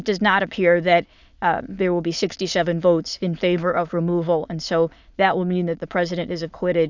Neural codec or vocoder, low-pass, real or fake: autoencoder, 22.05 kHz, a latent of 192 numbers a frame, VITS, trained on many speakers; 7.2 kHz; fake